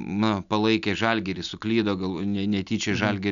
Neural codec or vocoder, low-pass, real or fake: none; 7.2 kHz; real